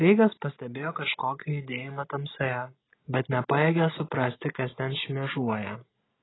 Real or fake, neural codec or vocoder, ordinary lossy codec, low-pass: real; none; AAC, 16 kbps; 7.2 kHz